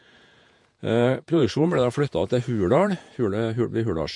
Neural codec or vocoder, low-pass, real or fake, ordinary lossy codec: vocoder, 48 kHz, 128 mel bands, Vocos; 10.8 kHz; fake; MP3, 48 kbps